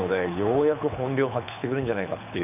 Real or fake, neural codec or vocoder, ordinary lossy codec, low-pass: fake; codec, 24 kHz, 6 kbps, HILCodec; none; 3.6 kHz